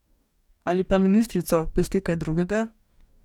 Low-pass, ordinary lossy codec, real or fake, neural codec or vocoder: 19.8 kHz; none; fake; codec, 44.1 kHz, 2.6 kbps, DAC